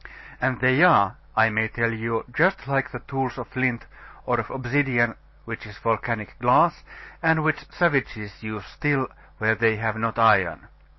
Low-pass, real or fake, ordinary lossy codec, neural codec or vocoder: 7.2 kHz; real; MP3, 24 kbps; none